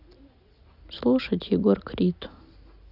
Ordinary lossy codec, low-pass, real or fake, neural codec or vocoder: Opus, 64 kbps; 5.4 kHz; real; none